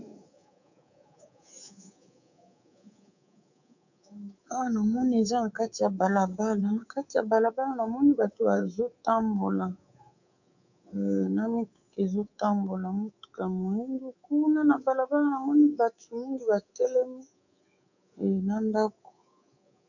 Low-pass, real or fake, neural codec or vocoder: 7.2 kHz; fake; codec, 24 kHz, 3.1 kbps, DualCodec